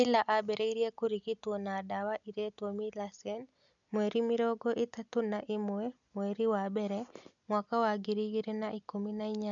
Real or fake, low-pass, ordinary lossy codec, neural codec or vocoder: real; 7.2 kHz; none; none